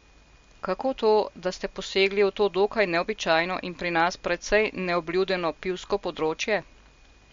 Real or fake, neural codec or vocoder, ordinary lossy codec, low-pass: real; none; MP3, 48 kbps; 7.2 kHz